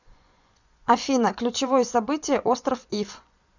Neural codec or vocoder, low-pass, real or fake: vocoder, 24 kHz, 100 mel bands, Vocos; 7.2 kHz; fake